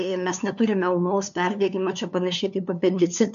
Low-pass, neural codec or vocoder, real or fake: 7.2 kHz; codec, 16 kHz, 2 kbps, FunCodec, trained on LibriTTS, 25 frames a second; fake